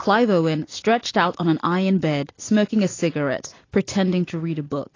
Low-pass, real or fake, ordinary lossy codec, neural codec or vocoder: 7.2 kHz; real; AAC, 32 kbps; none